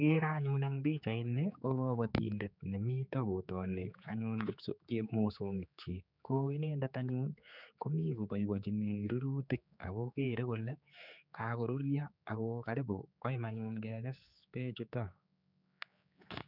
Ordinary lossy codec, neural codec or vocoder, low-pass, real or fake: none; codec, 16 kHz, 4 kbps, X-Codec, HuBERT features, trained on general audio; 5.4 kHz; fake